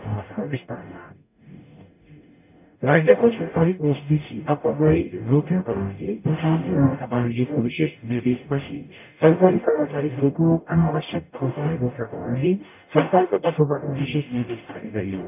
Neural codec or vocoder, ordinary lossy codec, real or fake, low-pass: codec, 44.1 kHz, 0.9 kbps, DAC; none; fake; 3.6 kHz